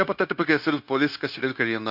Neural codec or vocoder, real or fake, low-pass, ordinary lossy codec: codec, 16 kHz, 0.9 kbps, LongCat-Audio-Codec; fake; 5.4 kHz; none